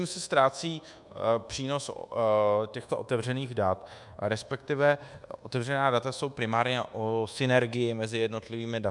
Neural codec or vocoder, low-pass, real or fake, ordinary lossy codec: codec, 24 kHz, 1.2 kbps, DualCodec; 10.8 kHz; fake; MP3, 96 kbps